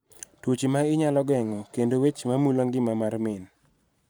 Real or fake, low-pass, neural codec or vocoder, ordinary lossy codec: fake; none; vocoder, 44.1 kHz, 128 mel bands every 512 samples, BigVGAN v2; none